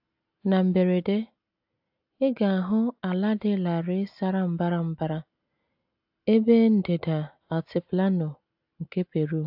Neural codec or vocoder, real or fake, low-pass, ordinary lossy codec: none; real; 5.4 kHz; AAC, 48 kbps